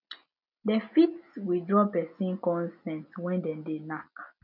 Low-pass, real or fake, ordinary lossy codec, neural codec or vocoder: 5.4 kHz; real; none; none